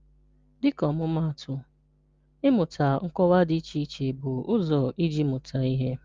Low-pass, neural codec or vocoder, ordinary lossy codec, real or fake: 7.2 kHz; none; Opus, 32 kbps; real